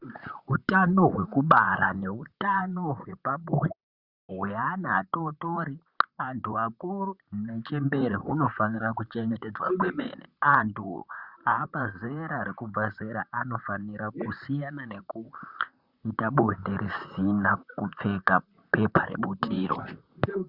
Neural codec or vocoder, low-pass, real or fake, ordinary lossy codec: vocoder, 44.1 kHz, 128 mel bands, Pupu-Vocoder; 5.4 kHz; fake; Opus, 64 kbps